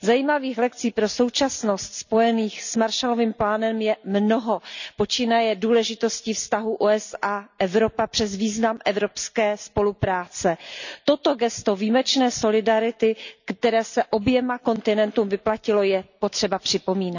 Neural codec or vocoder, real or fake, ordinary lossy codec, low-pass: none; real; none; 7.2 kHz